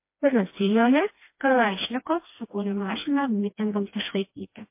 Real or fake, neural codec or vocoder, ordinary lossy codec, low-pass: fake; codec, 16 kHz, 1 kbps, FreqCodec, smaller model; MP3, 24 kbps; 3.6 kHz